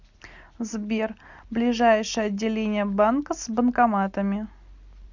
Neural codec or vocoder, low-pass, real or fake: none; 7.2 kHz; real